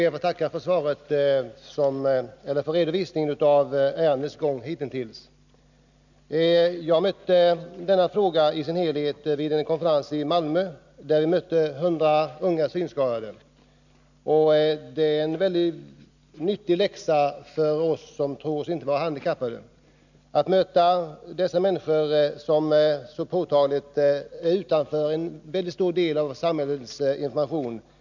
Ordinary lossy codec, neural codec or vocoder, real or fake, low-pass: none; none; real; 7.2 kHz